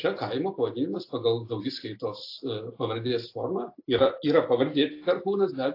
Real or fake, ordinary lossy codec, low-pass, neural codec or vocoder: real; AAC, 32 kbps; 5.4 kHz; none